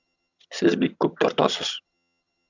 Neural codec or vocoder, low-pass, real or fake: vocoder, 22.05 kHz, 80 mel bands, HiFi-GAN; 7.2 kHz; fake